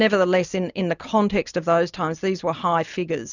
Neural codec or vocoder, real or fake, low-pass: none; real; 7.2 kHz